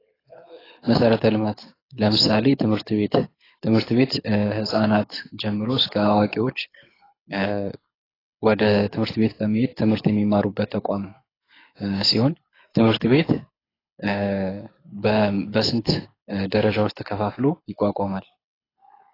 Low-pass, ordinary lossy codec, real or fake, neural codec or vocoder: 5.4 kHz; AAC, 24 kbps; fake; codec, 24 kHz, 6 kbps, HILCodec